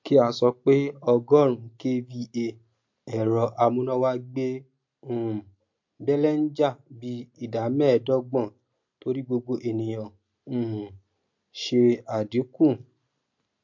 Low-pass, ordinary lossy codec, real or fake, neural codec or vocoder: 7.2 kHz; MP3, 48 kbps; real; none